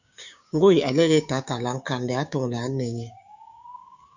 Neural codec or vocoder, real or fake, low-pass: codec, 16 kHz, 6 kbps, DAC; fake; 7.2 kHz